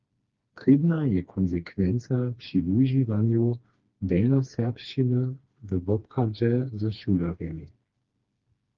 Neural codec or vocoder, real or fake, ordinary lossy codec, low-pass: codec, 16 kHz, 2 kbps, FreqCodec, smaller model; fake; Opus, 32 kbps; 7.2 kHz